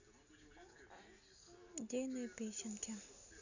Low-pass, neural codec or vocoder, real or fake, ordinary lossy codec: 7.2 kHz; none; real; none